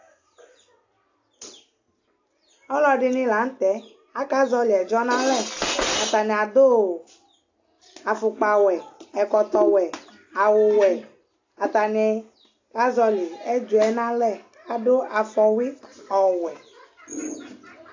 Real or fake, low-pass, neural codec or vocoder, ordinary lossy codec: real; 7.2 kHz; none; AAC, 48 kbps